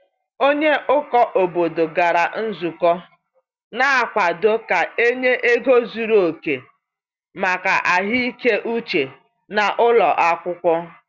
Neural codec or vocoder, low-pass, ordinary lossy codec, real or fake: none; 7.2 kHz; Opus, 64 kbps; real